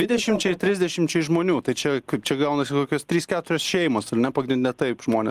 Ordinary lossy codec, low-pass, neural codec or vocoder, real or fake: Opus, 24 kbps; 14.4 kHz; none; real